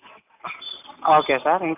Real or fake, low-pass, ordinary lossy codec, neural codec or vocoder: real; 3.6 kHz; none; none